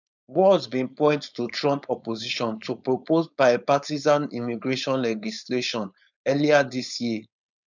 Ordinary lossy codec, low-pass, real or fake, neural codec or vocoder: none; 7.2 kHz; fake; codec, 16 kHz, 4.8 kbps, FACodec